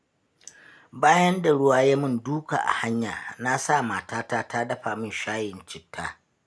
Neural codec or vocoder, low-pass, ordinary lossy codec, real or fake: none; none; none; real